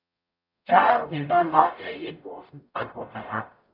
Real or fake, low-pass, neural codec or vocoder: fake; 5.4 kHz; codec, 44.1 kHz, 0.9 kbps, DAC